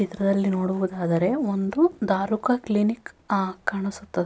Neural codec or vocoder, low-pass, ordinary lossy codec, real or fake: none; none; none; real